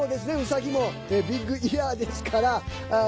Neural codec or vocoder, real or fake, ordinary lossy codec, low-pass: none; real; none; none